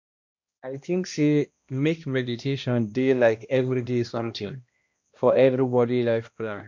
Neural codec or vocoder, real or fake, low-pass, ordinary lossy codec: codec, 16 kHz, 1 kbps, X-Codec, HuBERT features, trained on balanced general audio; fake; 7.2 kHz; MP3, 48 kbps